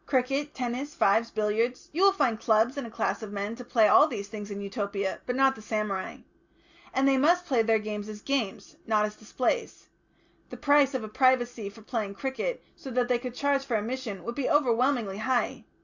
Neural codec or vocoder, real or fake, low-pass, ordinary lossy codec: none; real; 7.2 kHz; Opus, 64 kbps